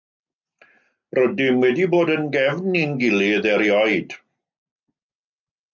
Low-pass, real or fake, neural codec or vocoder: 7.2 kHz; real; none